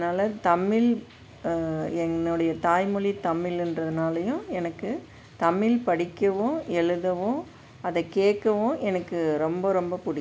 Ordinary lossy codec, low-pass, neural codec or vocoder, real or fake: none; none; none; real